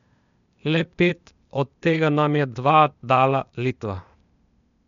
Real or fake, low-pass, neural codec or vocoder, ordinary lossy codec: fake; 7.2 kHz; codec, 16 kHz, 0.8 kbps, ZipCodec; none